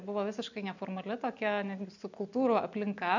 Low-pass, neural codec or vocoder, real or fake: 7.2 kHz; none; real